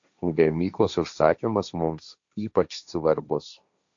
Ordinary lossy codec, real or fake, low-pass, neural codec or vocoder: AAC, 64 kbps; fake; 7.2 kHz; codec, 16 kHz, 1.1 kbps, Voila-Tokenizer